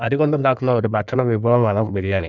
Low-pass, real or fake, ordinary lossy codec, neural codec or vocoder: 7.2 kHz; fake; none; codec, 16 kHz in and 24 kHz out, 1.1 kbps, FireRedTTS-2 codec